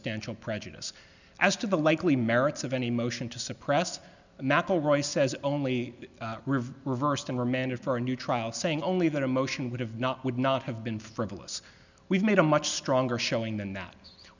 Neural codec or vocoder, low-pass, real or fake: none; 7.2 kHz; real